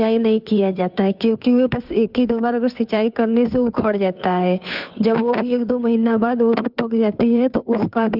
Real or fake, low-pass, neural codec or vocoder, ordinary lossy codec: fake; 5.4 kHz; codec, 16 kHz, 2 kbps, FunCodec, trained on Chinese and English, 25 frames a second; none